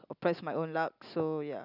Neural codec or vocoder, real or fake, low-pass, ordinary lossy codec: none; real; 5.4 kHz; none